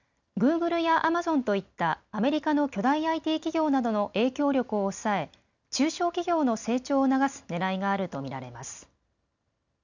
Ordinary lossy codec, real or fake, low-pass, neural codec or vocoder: none; real; 7.2 kHz; none